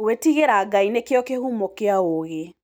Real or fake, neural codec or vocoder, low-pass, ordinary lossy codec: real; none; none; none